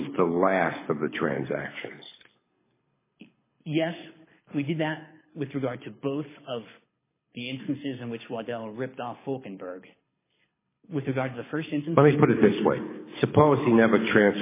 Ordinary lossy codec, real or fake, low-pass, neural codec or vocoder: MP3, 16 kbps; fake; 3.6 kHz; codec, 24 kHz, 6 kbps, HILCodec